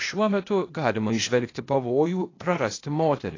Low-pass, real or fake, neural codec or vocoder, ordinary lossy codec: 7.2 kHz; fake; codec, 16 kHz, 0.8 kbps, ZipCodec; AAC, 32 kbps